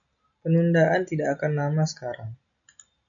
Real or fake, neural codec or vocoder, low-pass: real; none; 7.2 kHz